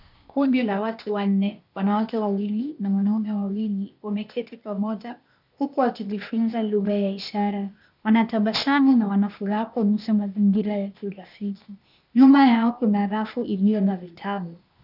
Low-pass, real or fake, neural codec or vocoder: 5.4 kHz; fake; codec, 16 kHz, 0.8 kbps, ZipCodec